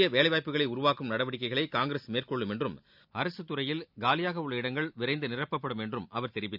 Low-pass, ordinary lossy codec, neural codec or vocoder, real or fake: 5.4 kHz; none; none; real